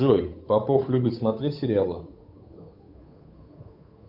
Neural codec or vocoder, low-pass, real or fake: codec, 16 kHz, 8 kbps, FunCodec, trained on Chinese and English, 25 frames a second; 5.4 kHz; fake